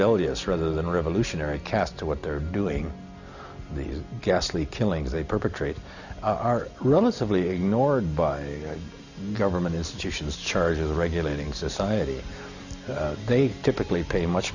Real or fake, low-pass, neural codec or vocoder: real; 7.2 kHz; none